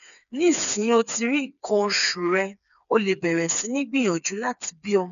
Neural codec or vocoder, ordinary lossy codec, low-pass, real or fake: codec, 16 kHz, 4 kbps, FreqCodec, smaller model; none; 7.2 kHz; fake